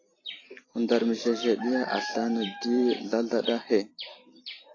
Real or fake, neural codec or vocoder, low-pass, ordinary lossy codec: fake; vocoder, 44.1 kHz, 128 mel bands every 256 samples, BigVGAN v2; 7.2 kHz; AAC, 32 kbps